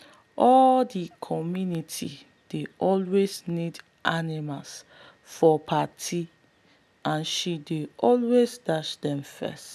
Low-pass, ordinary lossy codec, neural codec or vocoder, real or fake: 14.4 kHz; none; none; real